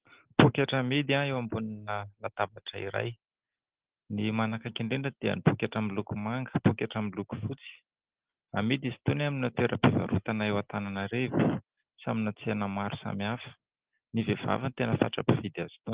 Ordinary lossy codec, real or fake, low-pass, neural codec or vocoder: Opus, 16 kbps; real; 3.6 kHz; none